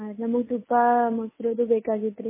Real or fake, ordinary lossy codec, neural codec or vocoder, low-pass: real; MP3, 16 kbps; none; 3.6 kHz